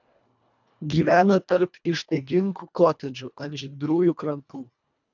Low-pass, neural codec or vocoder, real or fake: 7.2 kHz; codec, 24 kHz, 1.5 kbps, HILCodec; fake